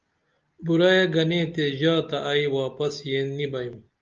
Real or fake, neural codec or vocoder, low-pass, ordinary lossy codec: real; none; 7.2 kHz; Opus, 24 kbps